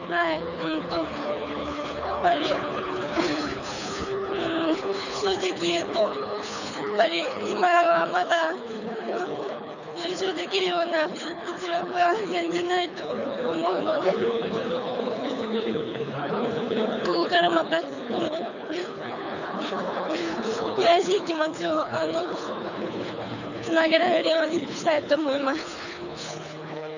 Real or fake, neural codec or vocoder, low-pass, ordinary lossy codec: fake; codec, 24 kHz, 3 kbps, HILCodec; 7.2 kHz; none